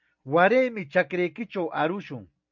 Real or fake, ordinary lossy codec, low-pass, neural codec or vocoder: real; MP3, 64 kbps; 7.2 kHz; none